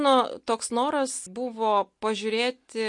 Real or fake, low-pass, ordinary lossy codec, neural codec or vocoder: real; 10.8 kHz; MP3, 48 kbps; none